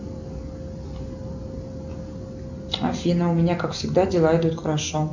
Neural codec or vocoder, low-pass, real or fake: none; 7.2 kHz; real